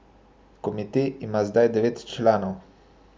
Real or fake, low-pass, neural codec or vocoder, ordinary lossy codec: real; none; none; none